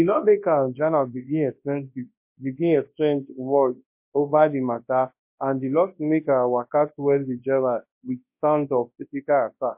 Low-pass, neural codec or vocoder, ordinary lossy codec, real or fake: 3.6 kHz; codec, 24 kHz, 0.9 kbps, WavTokenizer, large speech release; MP3, 32 kbps; fake